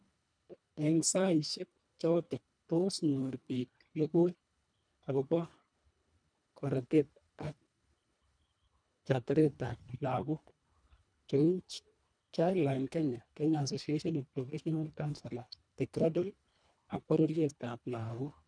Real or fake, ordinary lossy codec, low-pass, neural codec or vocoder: fake; none; 9.9 kHz; codec, 24 kHz, 1.5 kbps, HILCodec